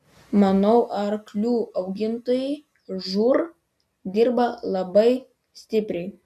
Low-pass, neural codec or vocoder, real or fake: 14.4 kHz; none; real